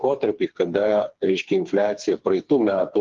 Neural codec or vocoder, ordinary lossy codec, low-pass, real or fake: codec, 16 kHz, 4 kbps, FreqCodec, smaller model; Opus, 24 kbps; 7.2 kHz; fake